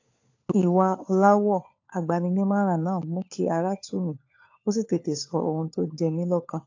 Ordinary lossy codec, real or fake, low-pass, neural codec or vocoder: AAC, 48 kbps; fake; 7.2 kHz; codec, 16 kHz, 4 kbps, FunCodec, trained on LibriTTS, 50 frames a second